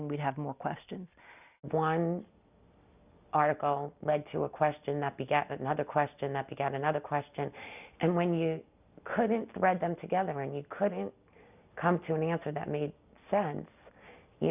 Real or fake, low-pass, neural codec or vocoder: real; 3.6 kHz; none